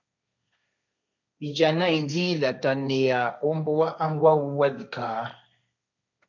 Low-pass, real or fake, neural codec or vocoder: 7.2 kHz; fake; codec, 16 kHz, 1.1 kbps, Voila-Tokenizer